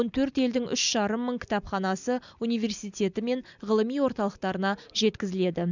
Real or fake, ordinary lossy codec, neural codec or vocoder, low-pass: real; none; none; 7.2 kHz